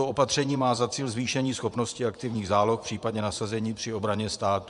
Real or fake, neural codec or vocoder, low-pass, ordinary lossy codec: fake; vocoder, 24 kHz, 100 mel bands, Vocos; 10.8 kHz; AAC, 96 kbps